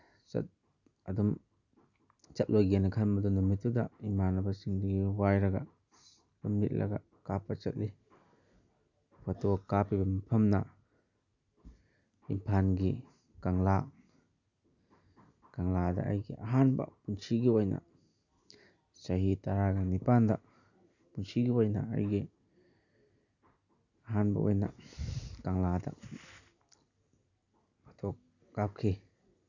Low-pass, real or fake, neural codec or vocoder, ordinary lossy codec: 7.2 kHz; real; none; none